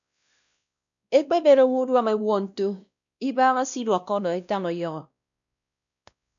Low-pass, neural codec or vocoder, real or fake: 7.2 kHz; codec, 16 kHz, 1 kbps, X-Codec, WavLM features, trained on Multilingual LibriSpeech; fake